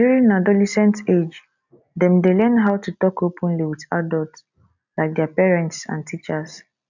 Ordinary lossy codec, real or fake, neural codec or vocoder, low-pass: none; real; none; 7.2 kHz